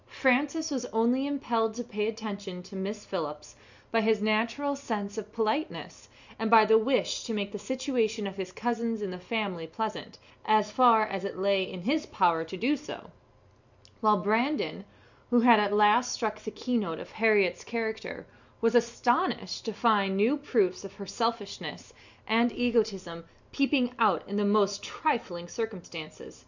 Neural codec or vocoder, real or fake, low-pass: none; real; 7.2 kHz